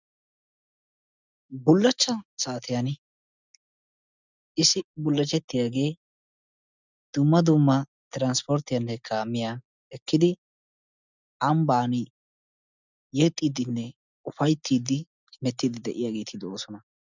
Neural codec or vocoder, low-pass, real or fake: none; 7.2 kHz; real